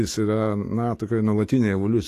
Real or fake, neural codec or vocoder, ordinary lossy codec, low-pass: fake; autoencoder, 48 kHz, 128 numbers a frame, DAC-VAE, trained on Japanese speech; AAC, 48 kbps; 14.4 kHz